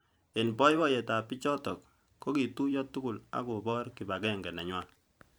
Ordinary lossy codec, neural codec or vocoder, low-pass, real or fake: none; vocoder, 44.1 kHz, 128 mel bands every 512 samples, BigVGAN v2; none; fake